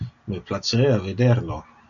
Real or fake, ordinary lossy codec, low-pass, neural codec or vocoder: real; AAC, 64 kbps; 7.2 kHz; none